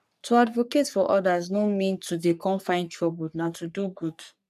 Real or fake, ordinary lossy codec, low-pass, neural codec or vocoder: fake; none; 14.4 kHz; codec, 44.1 kHz, 3.4 kbps, Pupu-Codec